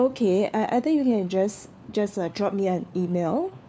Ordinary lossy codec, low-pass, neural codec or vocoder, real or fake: none; none; codec, 16 kHz, 4 kbps, FunCodec, trained on LibriTTS, 50 frames a second; fake